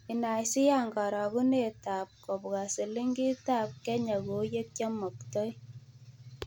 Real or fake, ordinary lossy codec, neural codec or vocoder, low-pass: real; none; none; none